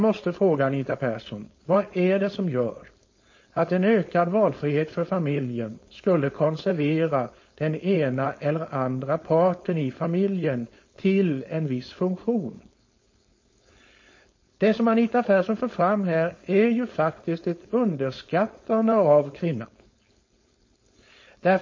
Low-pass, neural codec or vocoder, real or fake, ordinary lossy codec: 7.2 kHz; codec, 16 kHz, 4.8 kbps, FACodec; fake; MP3, 32 kbps